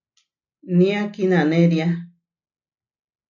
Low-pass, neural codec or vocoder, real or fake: 7.2 kHz; none; real